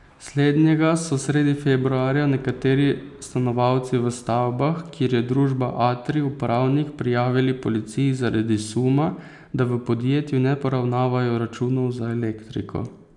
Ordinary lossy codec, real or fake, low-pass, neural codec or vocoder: none; real; 10.8 kHz; none